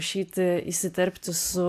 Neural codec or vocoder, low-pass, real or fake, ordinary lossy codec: none; 14.4 kHz; real; AAC, 96 kbps